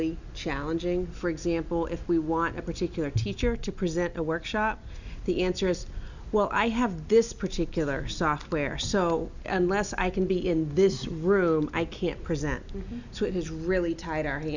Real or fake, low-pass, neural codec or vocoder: real; 7.2 kHz; none